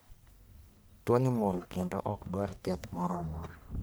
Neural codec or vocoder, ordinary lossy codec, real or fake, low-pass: codec, 44.1 kHz, 1.7 kbps, Pupu-Codec; none; fake; none